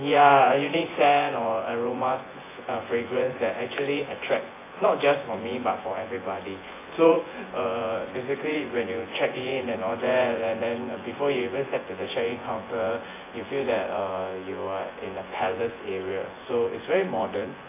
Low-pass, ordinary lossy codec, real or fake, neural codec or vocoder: 3.6 kHz; AAC, 16 kbps; fake; vocoder, 24 kHz, 100 mel bands, Vocos